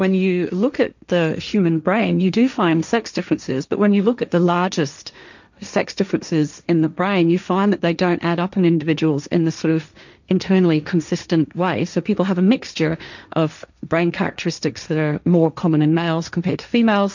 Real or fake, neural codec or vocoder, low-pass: fake; codec, 16 kHz, 1.1 kbps, Voila-Tokenizer; 7.2 kHz